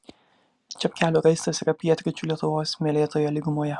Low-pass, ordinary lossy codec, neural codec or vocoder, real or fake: 10.8 kHz; Opus, 64 kbps; none; real